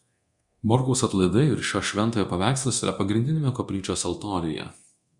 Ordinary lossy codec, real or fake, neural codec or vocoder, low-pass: Opus, 64 kbps; fake; codec, 24 kHz, 0.9 kbps, DualCodec; 10.8 kHz